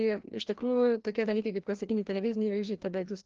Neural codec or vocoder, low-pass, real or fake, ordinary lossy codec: codec, 16 kHz, 1 kbps, FreqCodec, larger model; 7.2 kHz; fake; Opus, 16 kbps